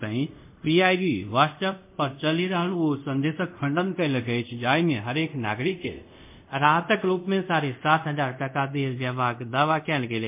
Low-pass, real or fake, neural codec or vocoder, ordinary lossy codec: 3.6 kHz; fake; codec, 24 kHz, 0.5 kbps, DualCodec; MP3, 32 kbps